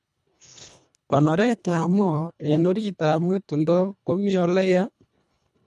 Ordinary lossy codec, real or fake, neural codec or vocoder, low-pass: none; fake; codec, 24 kHz, 1.5 kbps, HILCodec; none